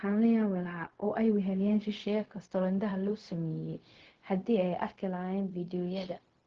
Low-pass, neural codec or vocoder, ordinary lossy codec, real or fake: 7.2 kHz; codec, 16 kHz, 0.4 kbps, LongCat-Audio-Codec; Opus, 16 kbps; fake